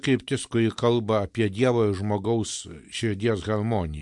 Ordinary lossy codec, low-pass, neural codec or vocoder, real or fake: MP3, 64 kbps; 10.8 kHz; none; real